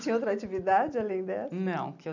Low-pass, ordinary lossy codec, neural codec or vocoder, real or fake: 7.2 kHz; none; none; real